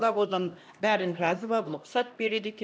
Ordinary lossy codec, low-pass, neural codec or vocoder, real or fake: none; none; codec, 16 kHz, 1 kbps, X-Codec, HuBERT features, trained on LibriSpeech; fake